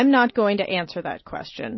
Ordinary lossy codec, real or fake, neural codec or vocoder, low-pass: MP3, 24 kbps; real; none; 7.2 kHz